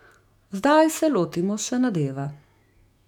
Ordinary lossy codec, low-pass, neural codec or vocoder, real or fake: none; 19.8 kHz; autoencoder, 48 kHz, 128 numbers a frame, DAC-VAE, trained on Japanese speech; fake